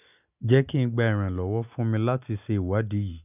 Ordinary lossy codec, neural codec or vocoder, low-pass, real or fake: none; none; 3.6 kHz; real